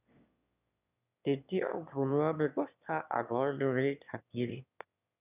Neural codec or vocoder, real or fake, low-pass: autoencoder, 22.05 kHz, a latent of 192 numbers a frame, VITS, trained on one speaker; fake; 3.6 kHz